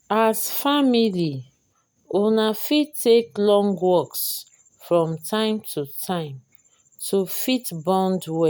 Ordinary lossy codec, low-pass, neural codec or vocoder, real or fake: none; none; none; real